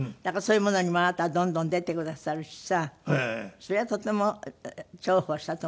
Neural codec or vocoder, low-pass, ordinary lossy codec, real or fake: none; none; none; real